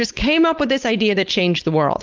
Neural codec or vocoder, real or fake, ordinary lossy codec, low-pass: codec, 16 kHz, 4.8 kbps, FACodec; fake; Opus, 32 kbps; 7.2 kHz